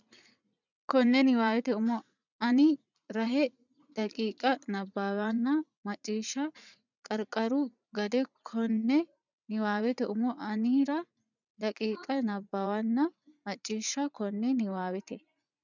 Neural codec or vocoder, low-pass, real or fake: vocoder, 44.1 kHz, 80 mel bands, Vocos; 7.2 kHz; fake